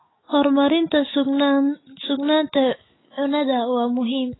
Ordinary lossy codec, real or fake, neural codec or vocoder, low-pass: AAC, 16 kbps; fake; autoencoder, 48 kHz, 128 numbers a frame, DAC-VAE, trained on Japanese speech; 7.2 kHz